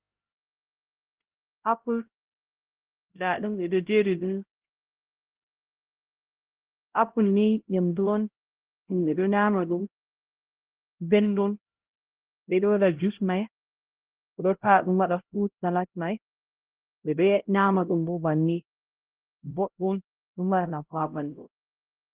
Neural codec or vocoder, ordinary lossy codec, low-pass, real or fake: codec, 16 kHz, 0.5 kbps, X-Codec, HuBERT features, trained on LibriSpeech; Opus, 16 kbps; 3.6 kHz; fake